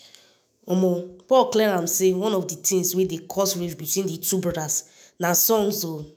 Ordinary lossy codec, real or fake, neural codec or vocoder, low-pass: none; fake; autoencoder, 48 kHz, 128 numbers a frame, DAC-VAE, trained on Japanese speech; none